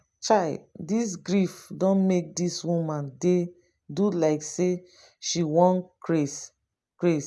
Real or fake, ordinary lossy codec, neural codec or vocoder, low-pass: real; none; none; none